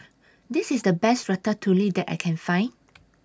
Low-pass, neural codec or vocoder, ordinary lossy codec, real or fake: none; none; none; real